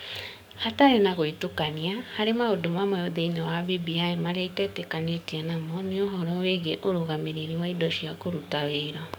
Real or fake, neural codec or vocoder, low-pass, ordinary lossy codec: fake; codec, 44.1 kHz, 7.8 kbps, DAC; none; none